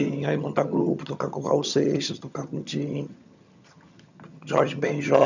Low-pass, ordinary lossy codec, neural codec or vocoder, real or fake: 7.2 kHz; none; vocoder, 22.05 kHz, 80 mel bands, HiFi-GAN; fake